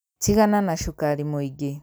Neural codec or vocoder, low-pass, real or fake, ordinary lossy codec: none; none; real; none